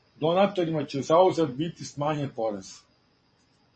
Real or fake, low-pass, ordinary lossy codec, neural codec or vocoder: fake; 9.9 kHz; MP3, 32 kbps; vocoder, 24 kHz, 100 mel bands, Vocos